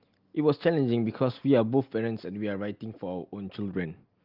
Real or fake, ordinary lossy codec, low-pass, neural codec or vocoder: real; Opus, 24 kbps; 5.4 kHz; none